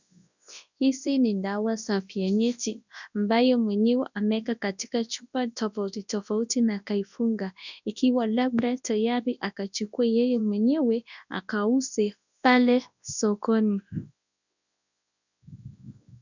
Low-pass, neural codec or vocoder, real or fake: 7.2 kHz; codec, 24 kHz, 0.9 kbps, WavTokenizer, large speech release; fake